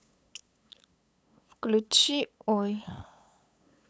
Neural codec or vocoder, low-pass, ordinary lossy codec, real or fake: codec, 16 kHz, 8 kbps, FunCodec, trained on LibriTTS, 25 frames a second; none; none; fake